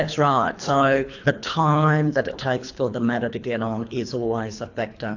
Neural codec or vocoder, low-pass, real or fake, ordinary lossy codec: codec, 24 kHz, 3 kbps, HILCodec; 7.2 kHz; fake; AAC, 48 kbps